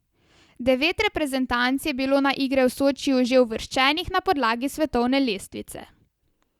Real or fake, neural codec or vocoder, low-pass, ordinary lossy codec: real; none; 19.8 kHz; Opus, 64 kbps